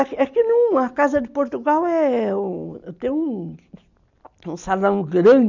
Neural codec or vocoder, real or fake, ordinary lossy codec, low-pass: none; real; none; 7.2 kHz